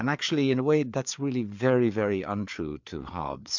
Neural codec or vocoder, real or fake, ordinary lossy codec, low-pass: codec, 16 kHz, 4 kbps, FreqCodec, larger model; fake; MP3, 64 kbps; 7.2 kHz